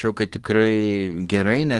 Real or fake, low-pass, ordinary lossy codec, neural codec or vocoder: fake; 10.8 kHz; Opus, 24 kbps; codec, 24 kHz, 1 kbps, SNAC